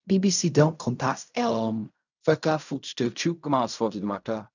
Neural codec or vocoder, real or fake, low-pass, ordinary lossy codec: codec, 16 kHz in and 24 kHz out, 0.4 kbps, LongCat-Audio-Codec, fine tuned four codebook decoder; fake; 7.2 kHz; none